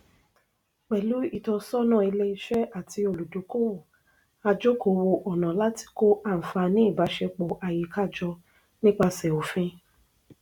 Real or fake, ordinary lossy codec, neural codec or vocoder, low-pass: real; none; none; 19.8 kHz